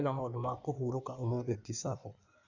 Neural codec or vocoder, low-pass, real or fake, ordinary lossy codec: codec, 16 kHz in and 24 kHz out, 1.1 kbps, FireRedTTS-2 codec; 7.2 kHz; fake; none